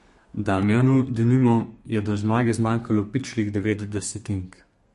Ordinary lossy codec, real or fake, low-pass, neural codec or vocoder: MP3, 48 kbps; fake; 14.4 kHz; codec, 32 kHz, 1.9 kbps, SNAC